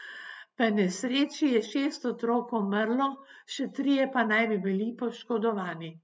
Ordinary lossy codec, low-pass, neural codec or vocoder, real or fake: none; none; none; real